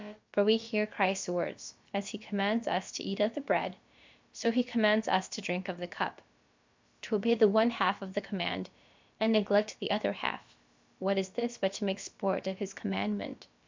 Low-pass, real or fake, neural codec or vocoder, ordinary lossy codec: 7.2 kHz; fake; codec, 16 kHz, about 1 kbps, DyCAST, with the encoder's durations; MP3, 64 kbps